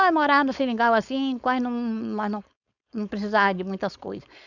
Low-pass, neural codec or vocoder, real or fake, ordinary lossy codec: 7.2 kHz; codec, 16 kHz, 4.8 kbps, FACodec; fake; none